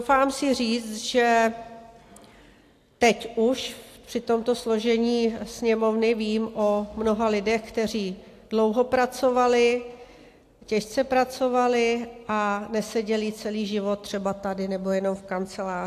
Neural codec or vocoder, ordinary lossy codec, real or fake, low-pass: none; AAC, 64 kbps; real; 14.4 kHz